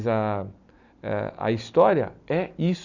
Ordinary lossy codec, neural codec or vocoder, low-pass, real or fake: none; none; 7.2 kHz; real